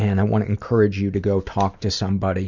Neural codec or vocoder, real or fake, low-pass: none; real; 7.2 kHz